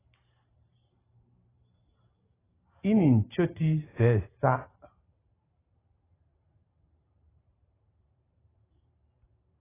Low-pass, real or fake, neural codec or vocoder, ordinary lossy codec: 3.6 kHz; fake; vocoder, 44.1 kHz, 128 mel bands every 512 samples, BigVGAN v2; AAC, 16 kbps